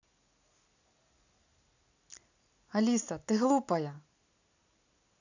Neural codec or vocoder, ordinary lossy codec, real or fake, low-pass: none; none; real; 7.2 kHz